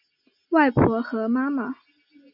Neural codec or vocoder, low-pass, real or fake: none; 5.4 kHz; real